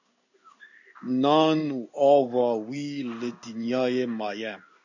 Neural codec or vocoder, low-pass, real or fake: codec, 16 kHz in and 24 kHz out, 1 kbps, XY-Tokenizer; 7.2 kHz; fake